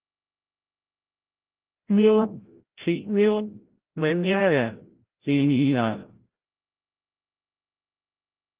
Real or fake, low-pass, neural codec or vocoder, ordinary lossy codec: fake; 3.6 kHz; codec, 16 kHz, 0.5 kbps, FreqCodec, larger model; Opus, 16 kbps